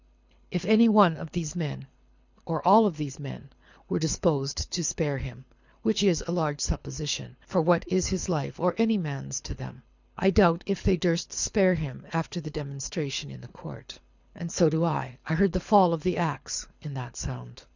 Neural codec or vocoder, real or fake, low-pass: codec, 24 kHz, 6 kbps, HILCodec; fake; 7.2 kHz